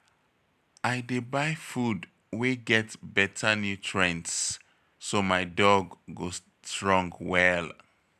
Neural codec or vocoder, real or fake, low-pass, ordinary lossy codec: none; real; 14.4 kHz; none